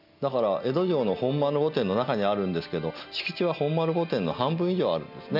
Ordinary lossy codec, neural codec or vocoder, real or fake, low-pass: none; none; real; 5.4 kHz